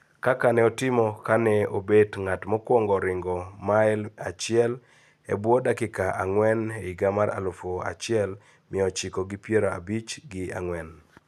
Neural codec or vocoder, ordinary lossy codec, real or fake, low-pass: none; none; real; 14.4 kHz